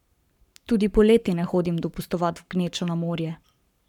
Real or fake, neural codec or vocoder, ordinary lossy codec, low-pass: fake; codec, 44.1 kHz, 7.8 kbps, Pupu-Codec; none; 19.8 kHz